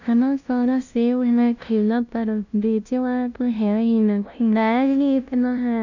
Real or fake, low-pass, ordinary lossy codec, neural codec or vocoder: fake; 7.2 kHz; none; codec, 16 kHz, 0.5 kbps, FunCodec, trained on LibriTTS, 25 frames a second